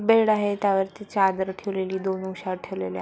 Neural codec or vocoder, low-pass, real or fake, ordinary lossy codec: none; none; real; none